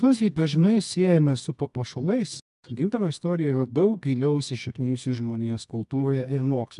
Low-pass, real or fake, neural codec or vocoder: 10.8 kHz; fake; codec, 24 kHz, 0.9 kbps, WavTokenizer, medium music audio release